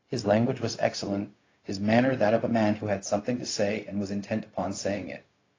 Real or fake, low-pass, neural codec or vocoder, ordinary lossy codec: fake; 7.2 kHz; codec, 16 kHz, 0.4 kbps, LongCat-Audio-Codec; AAC, 32 kbps